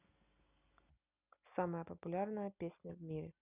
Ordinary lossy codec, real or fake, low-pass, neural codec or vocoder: none; real; 3.6 kHz; none